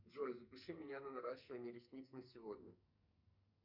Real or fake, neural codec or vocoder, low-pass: fake; codec, 44.1 kHz, 2.6 kbps, SNAC; 5.4 kHz